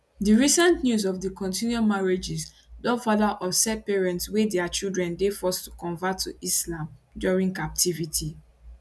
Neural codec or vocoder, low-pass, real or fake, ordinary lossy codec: none; none; real; none